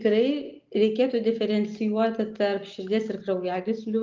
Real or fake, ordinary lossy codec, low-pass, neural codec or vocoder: real; Opus, 32 kbps; 7.2 kHz; none